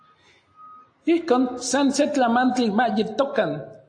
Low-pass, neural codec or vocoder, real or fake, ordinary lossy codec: 9.9 kHz; none; real; AAC, 48 kbps